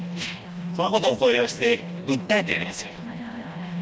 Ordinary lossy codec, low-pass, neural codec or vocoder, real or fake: none; none; codec, 16 kHz, 1 kbps, FreqCodec, smaller model; fake